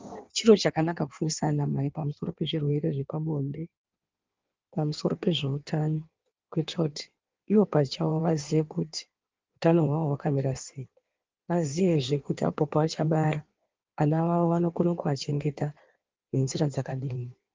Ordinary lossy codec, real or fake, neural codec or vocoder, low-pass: Opus, 32 kbps; fake; codec, 16 kHz in and 24 kHz out, 1.1 kbps, FireRedTTS-2 codec; 7.2 kHz